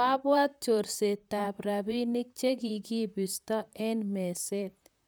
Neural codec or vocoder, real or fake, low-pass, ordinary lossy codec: vocoder, 44.1 kHz, 128 mel bands, Pupu-Vocoder; fake; none; none